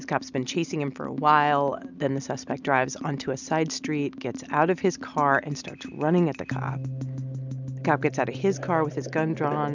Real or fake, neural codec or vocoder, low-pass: real; none; 7.2 kHz